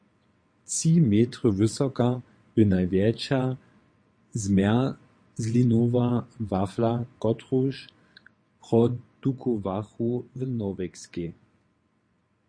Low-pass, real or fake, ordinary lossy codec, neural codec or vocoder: 9.9 kHz; fake; MP3, 48 kbps; vocoder, 22.05 kHz, 80 mel bands, WaveNeXt